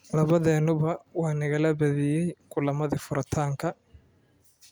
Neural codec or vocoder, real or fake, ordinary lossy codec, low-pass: none; real; none; none